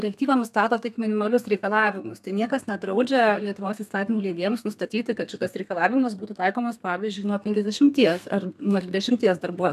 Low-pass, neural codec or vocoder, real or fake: 14.4 kHz; codec, 32 kHz, 1.9 kbps, SNAC; fake